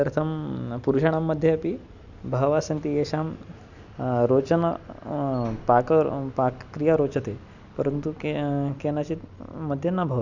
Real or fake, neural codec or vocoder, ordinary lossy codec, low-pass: real; none; none; 7.2 kHz